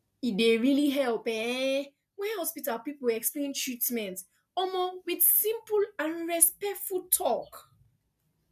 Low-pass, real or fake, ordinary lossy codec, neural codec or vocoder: 14.4 kHz; real; none; none